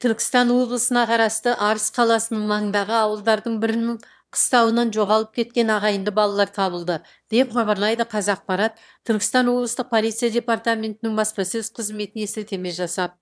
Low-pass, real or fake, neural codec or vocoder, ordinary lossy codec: none; fake; autoencoder, 22.05 kHz, a latent of 192 numbers a frame, VITS, trained on one speaker; none